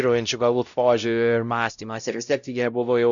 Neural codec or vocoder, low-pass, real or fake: codec, 16 kHz, 0.5 kbps, X-Codec, WavLM features, trained on Multilingual LibriSpeech; 7.2 kHz; fake